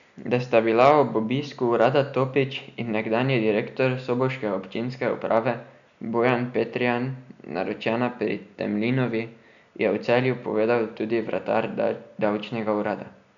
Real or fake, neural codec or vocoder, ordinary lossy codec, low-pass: real; none; none; 7.2 kHz